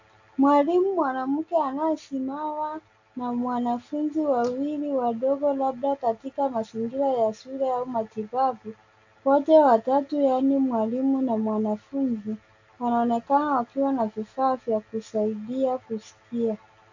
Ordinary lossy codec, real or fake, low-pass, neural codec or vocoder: AAC, 48 kbps; real; 7.2 kHz; none